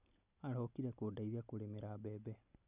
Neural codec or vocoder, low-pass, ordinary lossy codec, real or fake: none; 3.6 kHz; none; real